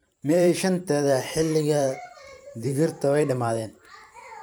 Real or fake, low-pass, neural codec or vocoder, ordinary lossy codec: fake; none; vocoder, 44.1 kHz, 128 mel bands every 512 samples, BigVGAN v2; none